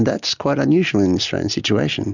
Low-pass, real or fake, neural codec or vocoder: 7.2 kHz; real; none